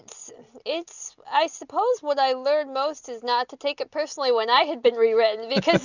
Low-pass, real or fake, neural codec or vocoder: 7.2 kHz; real; none